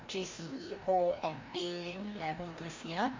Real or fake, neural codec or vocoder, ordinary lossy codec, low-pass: fake; codec, 16 kHz, 1 kbps, FreqCodec, larger model; MP3, 48 kbps; 7.2 kHz